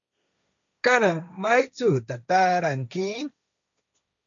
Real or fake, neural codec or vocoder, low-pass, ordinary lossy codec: fake; codec, 16 kHz, 1.1 kbps, Voila-Tokenizer; 7.2 kHz; MP3, 96 kbps